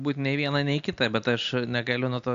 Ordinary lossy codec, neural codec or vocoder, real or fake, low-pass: AAC, 64 kbps; none; real; 7.2 kHz